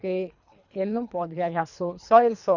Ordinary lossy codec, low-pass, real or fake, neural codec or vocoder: none; 7.2 kHz; fake; codec, 24 kHz, 3 kbps, HILCodec